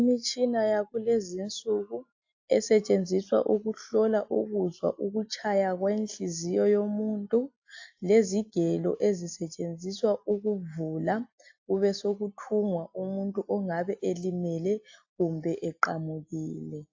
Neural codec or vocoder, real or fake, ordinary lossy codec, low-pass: none; real; AAC, 48 kbps; 7.2 kHz